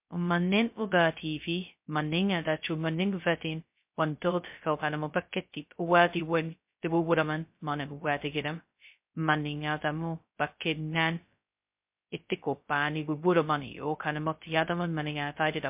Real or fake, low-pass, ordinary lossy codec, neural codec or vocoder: fake; 3.6 kHz; MP3, 32 kbps; codec, 16 kHz, 0.2 kbps, FocalCodec